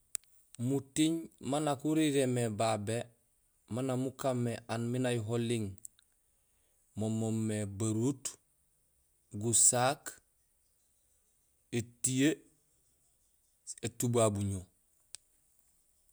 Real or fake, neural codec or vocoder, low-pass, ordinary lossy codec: real; none; none; none